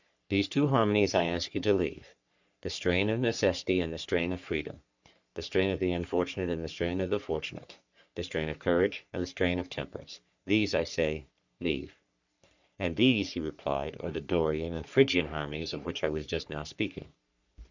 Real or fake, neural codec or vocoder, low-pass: fake; codec, 44.1 kHz, 3.4 kbps, Pupu-Codec; 7.2 kHz